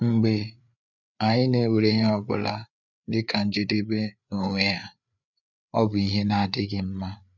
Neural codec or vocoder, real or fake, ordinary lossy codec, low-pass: codec, 16 kHz, 8 kbps, FreqCodec, larger model; fake; none; 7.2 kHz